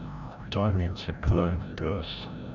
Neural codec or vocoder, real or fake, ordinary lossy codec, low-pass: codec, 16 kHz, 0.5 kbps, FreqCodec, larger model; fake; none; 7.2 kHz